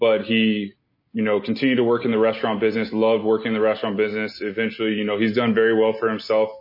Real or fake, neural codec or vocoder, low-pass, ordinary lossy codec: real; none; 5.4 kHz; MP3, 24 kbps